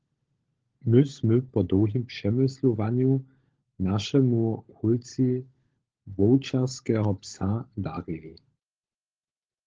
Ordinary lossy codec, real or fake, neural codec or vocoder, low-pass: Opus, 16 kbps; fake; codec, 16 kHz, 16 kbps, FunCodec, trained on LibriTTS, 50 frames a second; 7.2 kHz